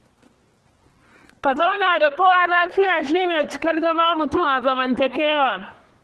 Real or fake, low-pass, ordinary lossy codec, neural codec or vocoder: fake; 10.8 kHz; Opus, 16 kbps; codec, 24 kHz, 1 kbps, SNAC